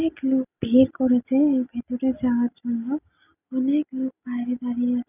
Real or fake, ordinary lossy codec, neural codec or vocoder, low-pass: real; none; none; 3.6 kHz